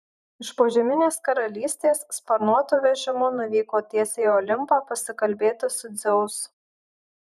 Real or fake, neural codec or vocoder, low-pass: fake; vocoder, 44.1 kHz, 128 mel bands every 512 samples, BigVGAN v2; 14.4 kHz